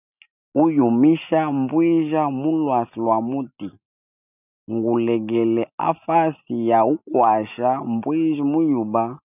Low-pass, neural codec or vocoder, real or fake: 3.6 kHz; none; real